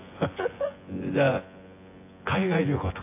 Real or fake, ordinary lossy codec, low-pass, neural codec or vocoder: fake; AAC, 24 kbps; 3.6 kHz; vocoder, 24 kHz, 100 mel bands, Vocos